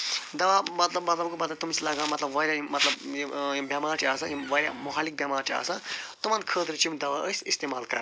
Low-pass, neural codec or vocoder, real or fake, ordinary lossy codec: none; none; real; none